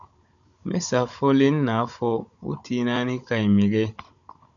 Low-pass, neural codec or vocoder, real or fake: 7.2 kHz; codec, 16 kHz, 16 kbps, FunCodec, trained on Chinese and English, 50 frames a second; fake